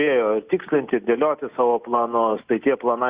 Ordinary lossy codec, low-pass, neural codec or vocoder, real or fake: Opus, 64 kbps; 3.6 kHz; none; real